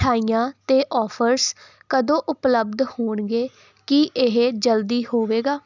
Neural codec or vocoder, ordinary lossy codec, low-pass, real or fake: none; none; 7.2 kHz; real